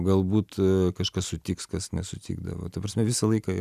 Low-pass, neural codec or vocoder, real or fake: 14.4 kHz; none; real